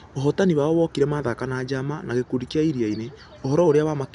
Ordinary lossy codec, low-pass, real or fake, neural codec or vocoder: none; 10.8 kHz; real; none